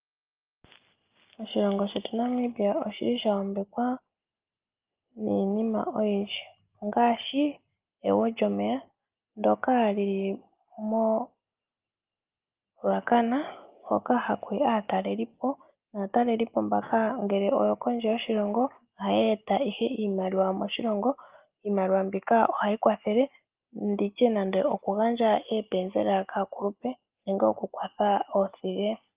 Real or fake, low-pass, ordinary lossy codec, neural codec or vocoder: real; 3.6 kHz; Opus, 32 kbps; none